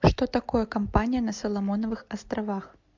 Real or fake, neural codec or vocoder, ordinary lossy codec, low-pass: real; none; MP3, 64 kbps; 7.2 kHz